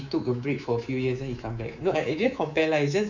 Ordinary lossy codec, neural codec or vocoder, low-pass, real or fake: none; codec, 24 kHz, 3.1 kbps, DualCodec; 7.2 kHz; fake